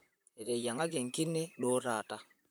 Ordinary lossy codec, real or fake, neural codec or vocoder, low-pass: none; fake; vocoder, 44.1 kHz, 128 mel bands, Pupu-Vocoder; none